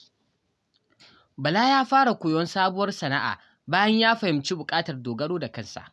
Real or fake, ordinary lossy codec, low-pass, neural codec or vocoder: real; none; none; none